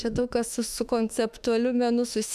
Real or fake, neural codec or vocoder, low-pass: fake; autoencoder, 48 kHz, 32 numbers a frame, DAC-VAE, trained on Japanese speech; 14.4 kHz